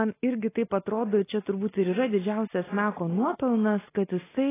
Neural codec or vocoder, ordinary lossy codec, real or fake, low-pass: none; AAC, 16 kbps; real; 3.6 kHz